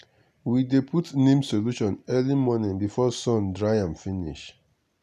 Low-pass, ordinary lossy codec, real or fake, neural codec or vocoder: 14.4 kHz; none; real; none